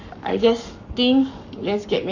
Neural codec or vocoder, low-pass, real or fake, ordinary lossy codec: codec, 44.1 kHz, 7.8 kbps, Pupu-Codec; 7.2 kHz; fake; none